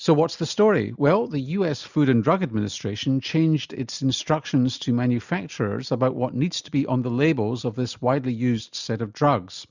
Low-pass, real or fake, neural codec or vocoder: 7.2 kHz; real; none